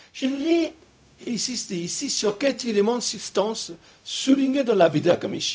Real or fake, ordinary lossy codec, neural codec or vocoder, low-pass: fake; none; codec, 16 kHz, 0.4 kbps, LongCat-Audio-Codec; none